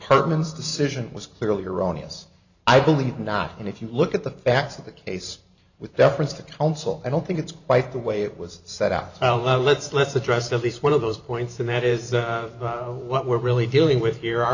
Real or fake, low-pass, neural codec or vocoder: fake; 7.2 kHz; vocoder, 44.1 kHz, 128 mel bands every 512 samples, BigVGAN v2